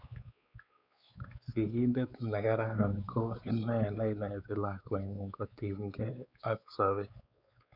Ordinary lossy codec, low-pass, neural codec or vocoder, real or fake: none; 5.4 kHz; codec, 16 kHz, 4 kbps, X-Codec, WavLM features, trained on Multilingual LibriSpeech; fake